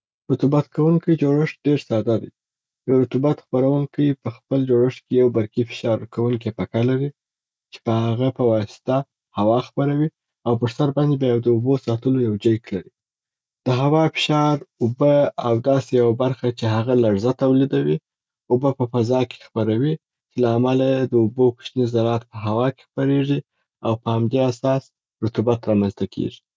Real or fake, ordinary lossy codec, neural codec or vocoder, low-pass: real; none; none; none